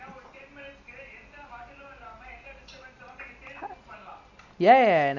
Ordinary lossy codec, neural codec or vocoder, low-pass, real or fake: none; none; 7.2 kHz; real